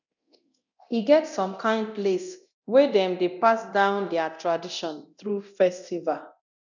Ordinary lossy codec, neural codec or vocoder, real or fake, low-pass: none; codec, 24 kHz, 0.9 kbps, DualCodec; fake; 7.2 kHz